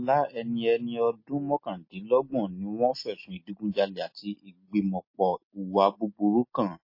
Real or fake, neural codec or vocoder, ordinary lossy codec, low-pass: real; none; MP3, 24 kbps; 5.4 kHz